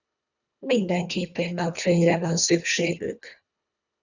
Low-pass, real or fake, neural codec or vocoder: 7.2 kHz; fake; codec, 24 kHz, 1.5 kbps, HILCodec